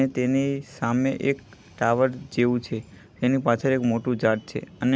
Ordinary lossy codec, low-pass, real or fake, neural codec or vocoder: none; none; real; none